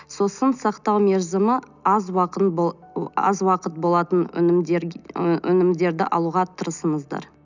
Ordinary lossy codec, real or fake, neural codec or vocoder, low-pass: none; real; none; 7.2 kHz